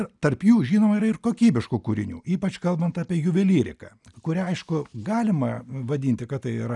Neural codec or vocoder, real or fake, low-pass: none; real; 10.8 kHz